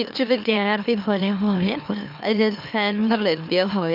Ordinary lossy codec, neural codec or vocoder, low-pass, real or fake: none; autoencoder, 44.1 kHz, a latent of 192 numbers a frame, MeloTTS; 5.4 kHz; fake